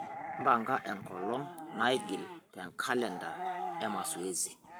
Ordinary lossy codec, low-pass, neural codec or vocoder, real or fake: none; none; codec, 44.1 kHz, 7.8 kbps, Pupu-Codec; fake